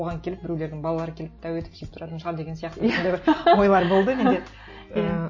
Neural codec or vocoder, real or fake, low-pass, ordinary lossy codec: none; real; 7.2 kHz; MP3, 32 kbps